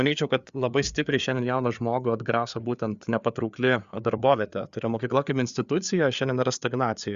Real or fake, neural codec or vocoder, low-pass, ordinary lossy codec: fake; codec, 16 kHz, 4 kbps, FreqCodec, larger model; 7.2 kHz; Opus, 64 kbps